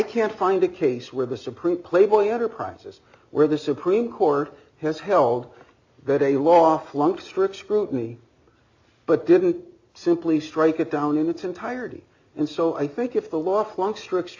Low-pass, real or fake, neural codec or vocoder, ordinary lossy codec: 7.2 kHz; real; none; AAC, 48 kbps